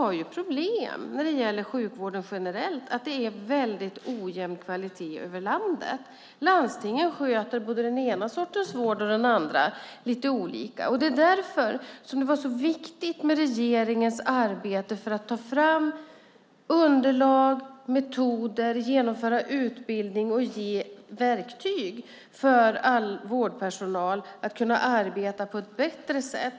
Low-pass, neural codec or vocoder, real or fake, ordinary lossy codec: none; none; real; none